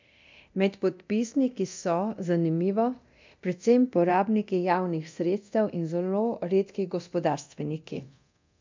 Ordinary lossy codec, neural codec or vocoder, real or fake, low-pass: MP3, 64 kbps; codec, 24 kHz, 0.9 kbps, DualCodec; fake; 7.2 kHz